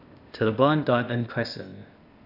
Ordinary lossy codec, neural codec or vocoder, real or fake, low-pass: none; codec, 16 kHz, 0.8 kbps, ZipCodec; fake; 5.4 kHz